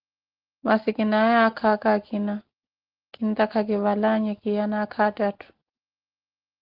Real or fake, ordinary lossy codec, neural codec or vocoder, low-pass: real; Opus, 16 kbps; none; 5.4 kHz